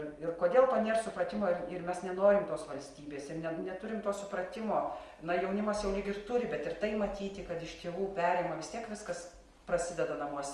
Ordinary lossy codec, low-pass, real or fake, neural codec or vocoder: Opus, 32 kbps; 10.8 kHz; real; none